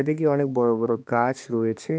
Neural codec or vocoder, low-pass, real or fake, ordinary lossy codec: codec, 16 kHz, 2 kbps, X-Codec, HuBERT features, trained on balanced general audio; none; fake; none